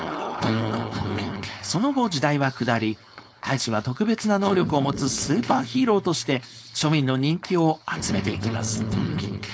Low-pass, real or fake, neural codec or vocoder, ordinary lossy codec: none; fake; codec, 16 kHz, 4.8 kbps, FACodec; none